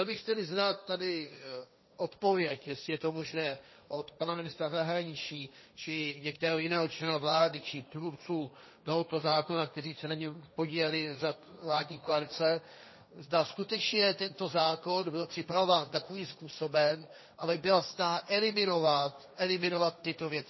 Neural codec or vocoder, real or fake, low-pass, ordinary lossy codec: codec, 16 kHz, 1.1 kbps, Voila-Tokenizer; fake; 7.2 kHz; MP3, 24 kbps